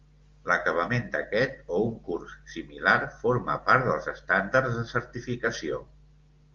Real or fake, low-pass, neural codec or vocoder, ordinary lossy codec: real; 7.2 kHz; none; Opus, 32 kbps